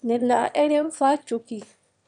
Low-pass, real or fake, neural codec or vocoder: 9.9 kHz; fake; autoencoder, 22.05 kHz, a latent of 192 numbers a frame, VITS, trained on one speaker